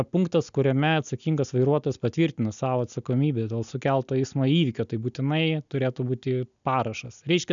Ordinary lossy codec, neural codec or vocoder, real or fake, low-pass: MP3, 96 kbps; none; real; 7.2 kHz